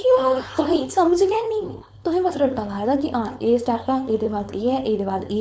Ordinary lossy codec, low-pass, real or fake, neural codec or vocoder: none; none; fake; codec, 16 kHz, 4.8 kbps, FACodec